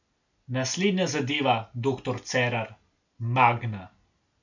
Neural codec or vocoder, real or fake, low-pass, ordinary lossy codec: none; real; 7.2 kHz; none